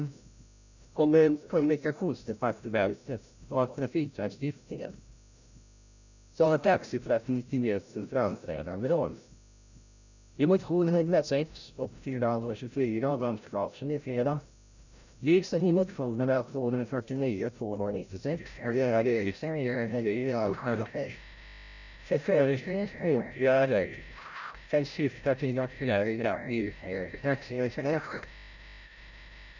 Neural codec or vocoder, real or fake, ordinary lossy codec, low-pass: codec, 16 kHz, 0.5 kbps, FreqCodec, larger model; fake; none; 7.2 kHz